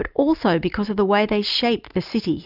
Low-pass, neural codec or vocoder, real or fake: 5.4 kHz; none; real